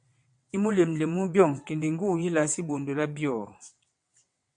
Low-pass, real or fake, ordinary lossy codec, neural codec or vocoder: 9.9 kHz; fake; MP3, 64 kbps; vocoder, 22.05 kHz, 80 mel bands, WaveNeXt